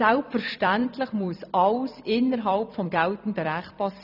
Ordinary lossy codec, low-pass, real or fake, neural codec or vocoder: none; 5.4 kHz; fake; vocoder, 44.1 kHz, 128 mel bands every 256 samples, BigVGAN v2